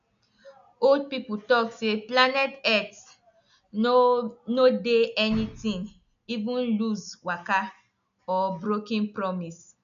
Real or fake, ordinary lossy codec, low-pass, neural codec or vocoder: real; none; 7.2 kHz; none